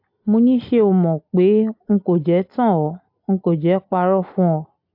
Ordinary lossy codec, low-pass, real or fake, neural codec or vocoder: AAC, 48 kbps; 5.4 kHz; real; none